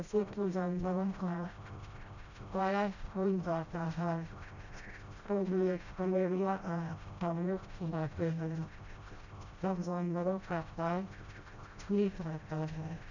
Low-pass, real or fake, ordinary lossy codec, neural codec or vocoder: 7.2 kHz; fake; none; codec, 16 kHz, 0.5 kbps, FreqCodec, smaller model